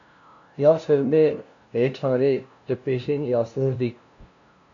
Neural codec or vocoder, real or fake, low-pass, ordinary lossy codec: codec, 16 kHz, 0.5 kbps, FunCodec, trained on LibriTTS, 25 frames a second; fake; 7.2 kHz; AAC, 64 kbps